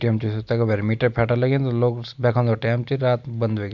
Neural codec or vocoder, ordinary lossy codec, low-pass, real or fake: none; MP3, 64 kbps; 7.2 kHz; real